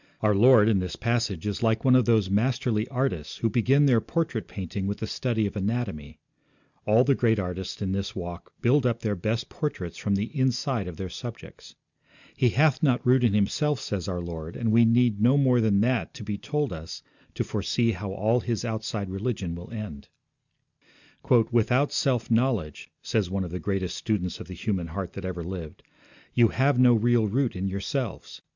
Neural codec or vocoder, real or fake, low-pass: none; real; 7.2 kHz